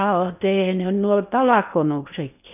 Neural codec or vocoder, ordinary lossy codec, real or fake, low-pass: codec, 16 kHz in and 24 kHz out, 0.8 kbps, FocalCodec, streaming, 65536 codes; none; fake; 3.6 kHz